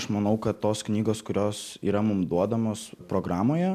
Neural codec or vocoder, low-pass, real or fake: none; 14.4 kHz; real